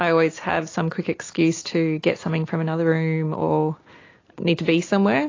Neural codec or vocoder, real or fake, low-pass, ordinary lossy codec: none; real; 7.2 kHz; AAC, 32 kbps